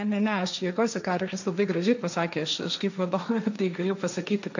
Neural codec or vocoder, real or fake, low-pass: codec, 16 kHz, 1.1 kbps, Voila-Tokenizer; fake; 7.2 kHz